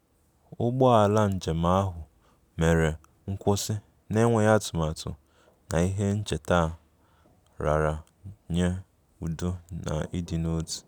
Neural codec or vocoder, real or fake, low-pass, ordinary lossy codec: none; real; none; none